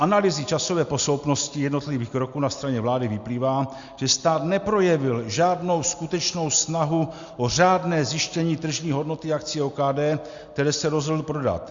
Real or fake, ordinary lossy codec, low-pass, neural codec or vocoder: real; Opus, 64 kbps; 7.2 kHz; none